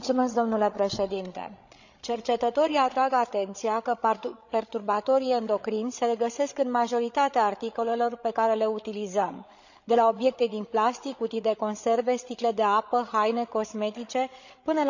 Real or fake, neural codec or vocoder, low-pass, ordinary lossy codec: fake; codec, 16 kHz, 16 kbps, FreqCodec, larger model; 7.2 kHz; none